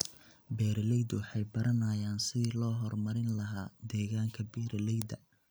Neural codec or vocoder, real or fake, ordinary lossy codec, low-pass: none; real; none; none